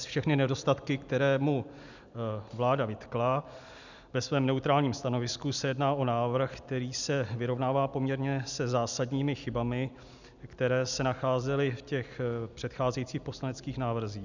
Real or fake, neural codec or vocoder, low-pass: real; none; 7.2 kHz